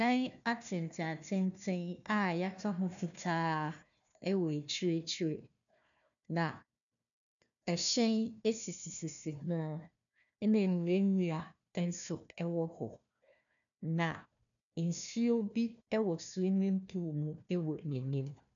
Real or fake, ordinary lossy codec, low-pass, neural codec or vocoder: fake; MP3, 96 kbps; 7.2 kHz; codec, 16 kHz, 1 kbps, FunCodec, trained on Chinese and English, 50 frames a second